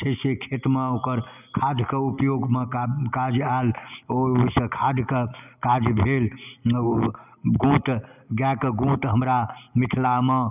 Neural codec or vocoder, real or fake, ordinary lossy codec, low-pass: none; real; none; 3.6 kHz